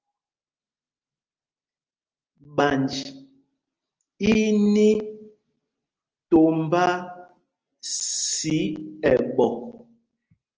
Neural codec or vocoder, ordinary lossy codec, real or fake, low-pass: none; Opus, 24 kbps; real; 7.2 kHz